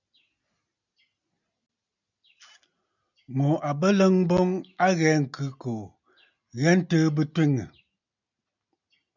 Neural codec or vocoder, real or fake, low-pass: none; real; 7.2 kHz